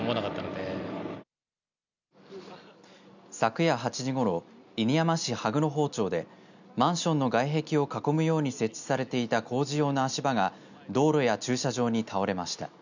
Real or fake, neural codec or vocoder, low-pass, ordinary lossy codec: real; none; 7.2 kHz; none